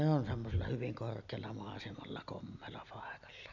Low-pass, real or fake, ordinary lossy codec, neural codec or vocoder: 7.2 kHz; real; none; none